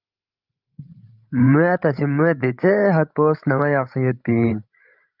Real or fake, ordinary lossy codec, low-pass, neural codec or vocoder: fake; Opus, 24 kbps; 5.4 kHz; codec, 16 kHz, 16 kbps, FreqCodec, larger model